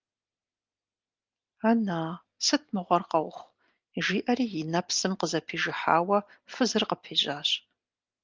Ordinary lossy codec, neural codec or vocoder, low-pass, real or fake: Opus, 24 kbps; none; 7.2 kHz; real